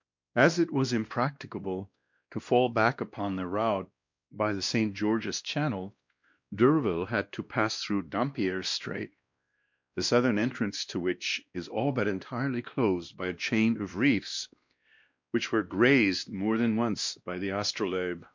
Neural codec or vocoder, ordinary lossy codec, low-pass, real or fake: codec, 16 kHz, 1 kbps, X-Codec, WavLM features, trained on Multilingual LibriSpeech; MP3, 64 kbps; 7.2 kHz; fake